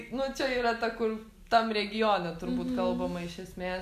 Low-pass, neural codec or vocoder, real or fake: 14.4 kHz; none; real